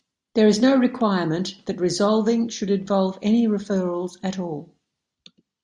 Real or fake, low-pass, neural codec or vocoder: real; 10.8 kHz; none